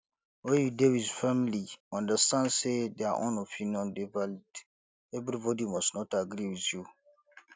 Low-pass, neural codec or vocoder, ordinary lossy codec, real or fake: none; none; none; real